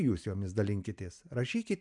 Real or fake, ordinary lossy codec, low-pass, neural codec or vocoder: real; MP3, 96 kbps; 10.8 kHz; none